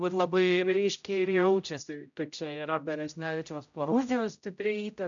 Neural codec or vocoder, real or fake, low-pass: codec, 16 kHz, 0.5 kbps, X-Codec, HuBERT features, trained on general audio; fake; 7.2 kHz